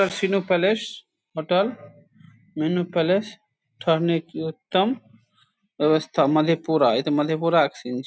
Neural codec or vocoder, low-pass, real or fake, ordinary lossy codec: none; none; real; none